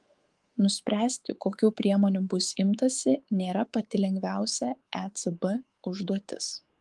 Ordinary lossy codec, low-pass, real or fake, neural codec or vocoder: Opus, 24 kbps; 10.8 kHz; fake; codec, 24 kHz, 3.1 kbps, DualCodec